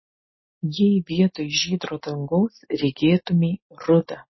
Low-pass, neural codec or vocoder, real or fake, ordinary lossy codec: 7.2 kHz; none; real; MP3, 24 kbps